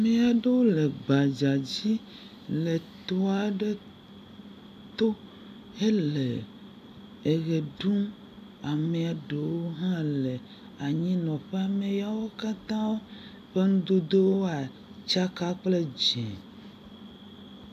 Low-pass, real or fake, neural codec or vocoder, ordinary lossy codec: 14.4 kHz; real; none; AAC, 96 kbps